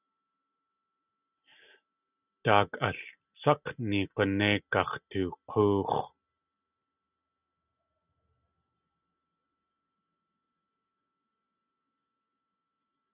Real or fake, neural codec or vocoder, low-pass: real; none; 3.6 kHz